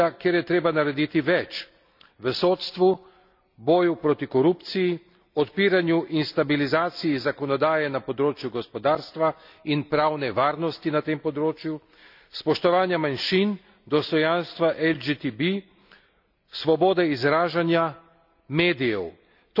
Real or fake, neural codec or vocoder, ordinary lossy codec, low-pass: real; none; none; 5.4 kHz